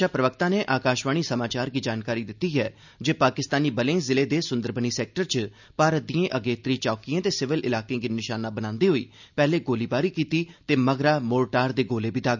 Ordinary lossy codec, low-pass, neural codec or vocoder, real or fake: none; 7.2 kHz; none; real